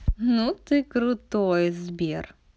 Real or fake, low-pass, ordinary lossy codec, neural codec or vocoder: real; none; none; none